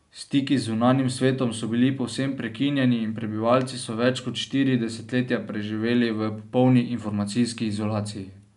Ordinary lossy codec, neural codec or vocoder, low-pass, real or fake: none; none; 10.8 kHz; real